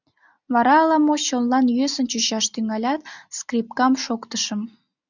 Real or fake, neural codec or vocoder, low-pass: real; none; 7.2 kHz